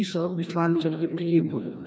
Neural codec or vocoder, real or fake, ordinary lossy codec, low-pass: codec, 16 kHz, 1 kbps, FreqCodec, larger model; fake; none; none